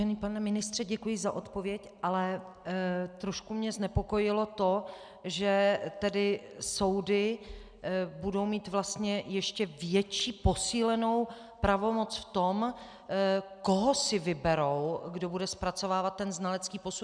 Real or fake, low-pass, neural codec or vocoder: real; 9.9 kHz; none